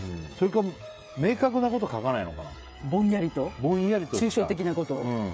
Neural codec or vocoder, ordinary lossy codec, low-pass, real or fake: codec, 16 kHz, 16 kbps, FreqCodec, smaller model; none; none; fake